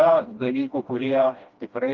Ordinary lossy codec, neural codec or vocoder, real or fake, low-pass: Opus, 32 kbps; codec, 16 kHz, 1 kbps, FreqCodec, smaller model; fake; 7.2 kHz